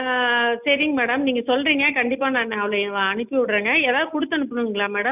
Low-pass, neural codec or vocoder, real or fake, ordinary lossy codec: 3.6 kHz; none; real; none